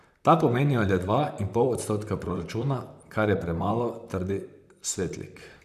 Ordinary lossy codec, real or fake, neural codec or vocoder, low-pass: none; fake; vocoder, 44.1 kHz, 128 mel bands, Pupu-Vocoder; 14.4 kHz